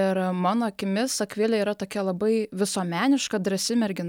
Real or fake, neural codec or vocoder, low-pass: real; none; 19.8 kHz